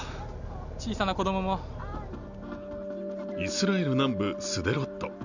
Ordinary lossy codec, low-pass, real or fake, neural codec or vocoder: none; 7.2 kHz; real; none